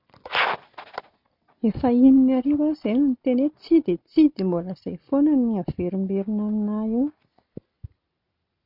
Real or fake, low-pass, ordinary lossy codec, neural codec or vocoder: real; 5.4 kHz; none; none